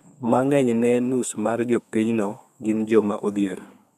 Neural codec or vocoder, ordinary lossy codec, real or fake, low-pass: codec, 32 kHz, 1.9 kbps, SNAC; none; fake; 14.4 kHz